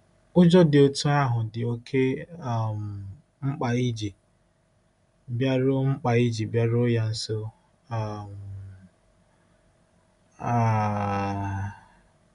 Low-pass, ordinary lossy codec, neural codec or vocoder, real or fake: 10.8 kHz; none; none; real